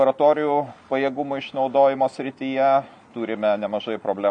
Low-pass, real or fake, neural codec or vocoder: 9.9 kHz; real; none